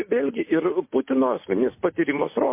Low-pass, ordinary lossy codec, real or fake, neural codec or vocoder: 3.6 kHz; MP3, 24 kbps; real; none